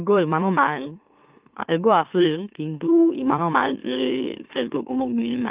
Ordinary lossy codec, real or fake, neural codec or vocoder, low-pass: Opus, 24 kbps; fake; autoencoder, 44.1 kHz, a latent of 192 numbers a frame, MeloTTS; 3.6 kHz